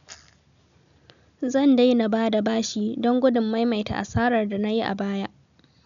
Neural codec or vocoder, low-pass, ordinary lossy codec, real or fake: none; 7.2 kHz; none; real